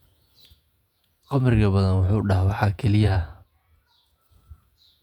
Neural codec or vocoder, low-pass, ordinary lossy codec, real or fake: vocoder, 48 kHz, 128 mel bands, Vocos; 19.8 kHz; none; fake